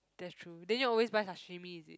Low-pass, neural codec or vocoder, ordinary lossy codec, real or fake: none; none; none; real